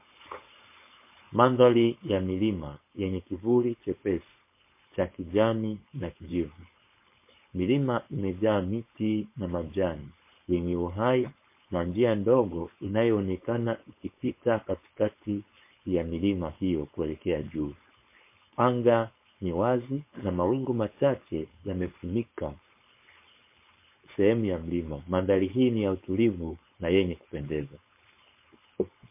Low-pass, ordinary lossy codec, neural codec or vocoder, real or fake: 3.6 kHz; MP3, 24 kbps; codec, 16 kHz, 4.8 kbps, FACodec; fake